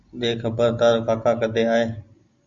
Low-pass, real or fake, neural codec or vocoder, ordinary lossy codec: 7.2 kHz; real; none; Opus, 64 kbps